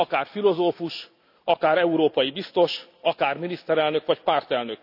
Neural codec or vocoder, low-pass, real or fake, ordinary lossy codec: none; 5.4 kHz; real; none